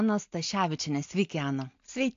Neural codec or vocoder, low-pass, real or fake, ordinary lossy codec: none; 7.2 kHz; real; AAC, 48 kbps